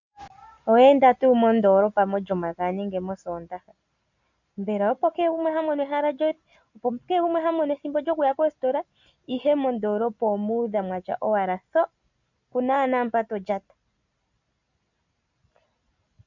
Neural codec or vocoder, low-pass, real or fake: none; 7.2 kHz; real